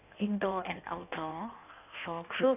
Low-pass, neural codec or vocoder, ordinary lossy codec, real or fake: 3.6 kHz; codec, 16 kHz in and 24 kHz out, 1.1 kbps, FireRedTTS-2 codec; none; fake